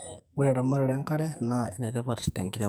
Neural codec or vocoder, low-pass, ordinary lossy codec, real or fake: codec, 44.1 kHz, 2.6 kbps, SNAC; none; none; fake